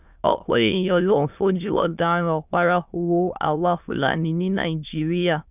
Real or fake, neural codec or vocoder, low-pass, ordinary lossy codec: fake; autoencoder, 22.05 kHz, a latent of 192 numbers a frame, VITS, trained on many speakers; 3.6 kHz; none